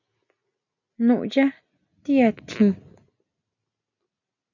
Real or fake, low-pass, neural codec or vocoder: real; 7.2 kHz; none